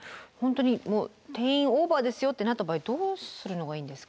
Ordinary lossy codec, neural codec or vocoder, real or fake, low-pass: none; none; real; none